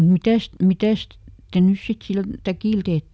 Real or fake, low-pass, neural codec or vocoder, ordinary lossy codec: real; none; none; none